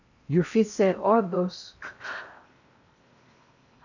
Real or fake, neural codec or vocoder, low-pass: fake; codec, 16 kHz in and 24 kHz out, 0.8 kbps, FocalCodec, streaming, 65536 codes; 7.2 kHz